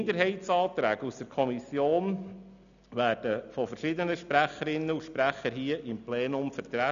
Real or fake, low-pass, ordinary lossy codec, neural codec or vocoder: real; 7.2 kHz; none; none